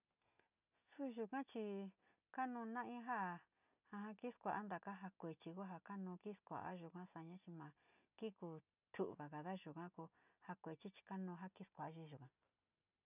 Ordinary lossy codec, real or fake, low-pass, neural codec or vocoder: none; real; 3.6 kHz; none